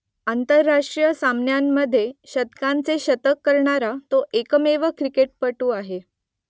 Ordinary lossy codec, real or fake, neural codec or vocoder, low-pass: none; real; none; none